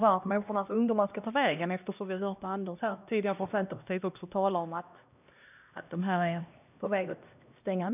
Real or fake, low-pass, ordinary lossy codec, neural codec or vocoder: fake; 3.6 kHz; none; codec, 16 kHz, 1 kbps, X-Codec, HuBERT features, trained on LibriSpeech